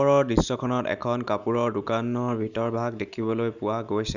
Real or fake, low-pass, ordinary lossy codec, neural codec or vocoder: real; 7.2 kHz; none; none